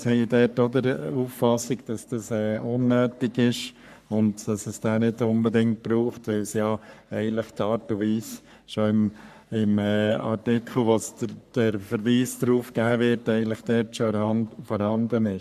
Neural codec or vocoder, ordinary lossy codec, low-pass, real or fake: codec, 44.1 kHz, 3.4 kbps, Pupu-Codec; MP3, 96 kbps; 14.4 kHz; fake